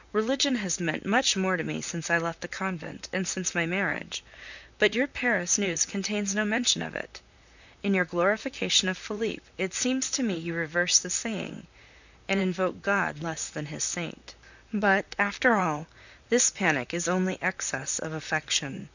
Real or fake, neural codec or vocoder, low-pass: fake; vocoder, 44.1 kHz, 128 mel bands, Pupu-Vocoder; 7.2 kHz